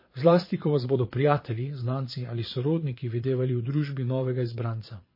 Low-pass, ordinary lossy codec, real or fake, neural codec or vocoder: 5.4 kHz; MP3, 24 kbps; fake; vocoder, 22.05 kHz, 80 mel bands, Vocos